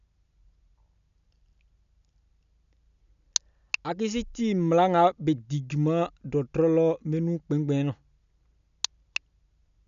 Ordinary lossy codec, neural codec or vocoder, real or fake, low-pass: none; none; real; 7.2 kHz